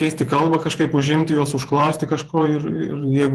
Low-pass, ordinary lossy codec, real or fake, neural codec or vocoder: 14.4 kHz; Opus, 32 kbps; fake; vocoder, 48 kHz, 128 mel bands, Vocos